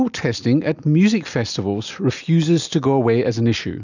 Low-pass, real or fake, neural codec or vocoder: 7.2 kHz; real; none